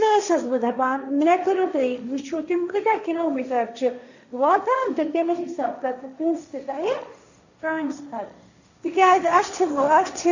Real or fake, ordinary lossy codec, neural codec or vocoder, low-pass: fake; none; codec, 16 kHz, 1.1 kbps, Voila-Tokenizer; 7.2 kHz